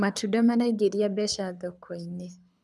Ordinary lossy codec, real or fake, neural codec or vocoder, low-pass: none; fake; codec, 24 kHz, 6 kbps, HILCodec; none